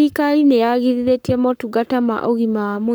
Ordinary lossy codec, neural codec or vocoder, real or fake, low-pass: none; codec, 44.1 kHz, 7.8 kbps, Pupu-Codec; fake; none